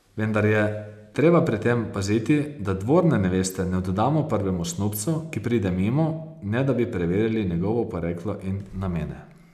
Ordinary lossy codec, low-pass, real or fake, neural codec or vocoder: none; 14.4 kHz; real; none